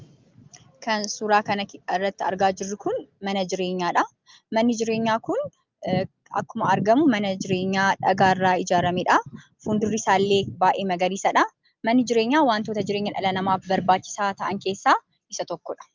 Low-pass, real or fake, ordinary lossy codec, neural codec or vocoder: 7.2 kHz; real; Opus, 24 kbps; none